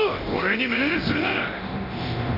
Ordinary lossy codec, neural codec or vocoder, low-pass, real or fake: none; codec, 24 kHz, 0.9 kbps, DualCodec; 5.4 kHz; fake